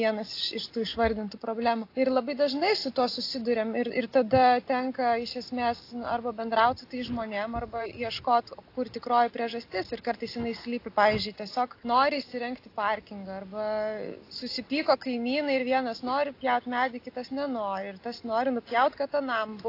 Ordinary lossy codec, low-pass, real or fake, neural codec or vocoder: AAC, 32 kbps; 5.4 kHz; real; none